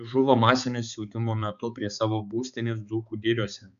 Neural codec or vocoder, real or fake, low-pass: codec, 16 kHz, 4 kbps, X-Codec, HuBERT features, trained on balanced general audio; fake; 7.2 kHz